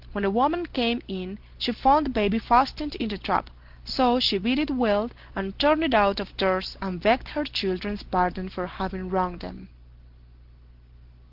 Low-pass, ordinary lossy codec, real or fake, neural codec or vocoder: 5.4 kHz; Opus, 16 kbps; real; none